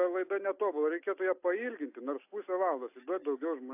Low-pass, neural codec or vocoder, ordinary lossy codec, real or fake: 3.6 kHz; none; AAC, 32 kbps; real